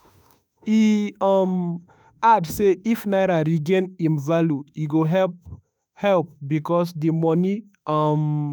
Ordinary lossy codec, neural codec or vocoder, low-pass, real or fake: none; autoencoder, 48 kHz, 32 numbers a frame, DAC-VAE, trained on Japanese speech; none; fake